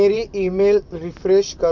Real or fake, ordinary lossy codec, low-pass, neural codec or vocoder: fake; none; 7.2 kHz; vocoder, 44.1 kHz, 128 mel bands, Pupu-Vocoder